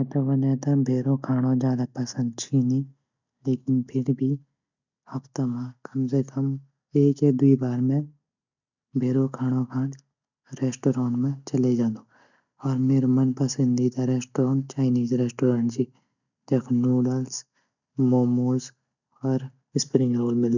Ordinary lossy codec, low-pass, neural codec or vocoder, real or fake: none; 7.2 kHz; none; real